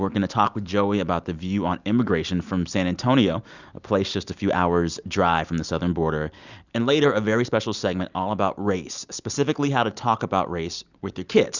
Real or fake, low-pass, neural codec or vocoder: real; 7.2 kHz; none